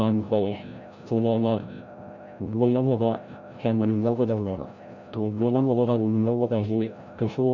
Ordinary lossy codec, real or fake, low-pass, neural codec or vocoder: none; fake; 7.2 kHz; codec, 16 kHz, 0.5 kbps, FreqCodec, larger model